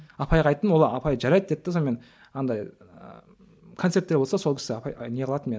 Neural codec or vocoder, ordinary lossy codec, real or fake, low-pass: none; none; real; none